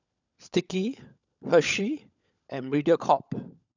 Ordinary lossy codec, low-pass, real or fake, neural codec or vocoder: none; 7.2 kHz; fake; codec, 16 kHz, 16 kbps, FunCodec, trained on LibriTTS, 50 frames a second